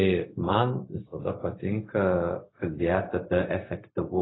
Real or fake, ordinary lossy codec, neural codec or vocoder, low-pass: fake; AAC, 16 kbps; codec, 16 kHz, 0.4 kbps, LongCat-Audio-Codec; 7.2 kHz